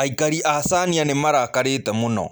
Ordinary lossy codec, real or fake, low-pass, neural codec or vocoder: none; real; none; none